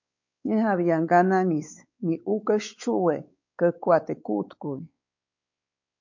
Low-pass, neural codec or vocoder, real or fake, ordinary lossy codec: 7.2 kHz; codec, 16 kHz, 4 kbps, X-Codec, WavLM features, trained on Multilingual LibriSpeech; fake; MP3, 48 kbps